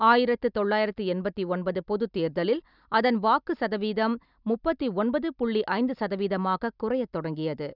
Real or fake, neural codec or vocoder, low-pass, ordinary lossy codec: real; none; 5.4 kHz; none